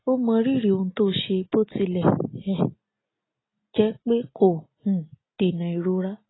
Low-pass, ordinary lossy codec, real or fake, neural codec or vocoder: 7.2 kHz; AAC, 16 kbps; real; none